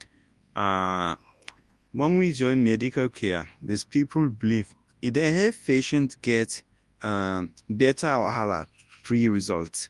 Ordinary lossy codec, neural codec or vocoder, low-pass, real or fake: Opus, 32 kbps; codec, 24 kHz, 0.9 kbps, WavTokenizer, large speech release; 10.8 kHz; fake